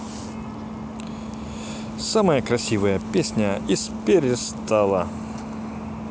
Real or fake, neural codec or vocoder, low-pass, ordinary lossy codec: real; none; none; none